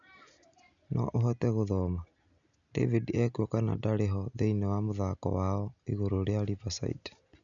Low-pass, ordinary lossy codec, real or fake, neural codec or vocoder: 7.2 kHz; none; real; none